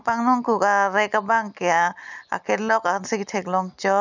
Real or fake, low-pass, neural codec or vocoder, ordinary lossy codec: real; 7.2 kHz; none; none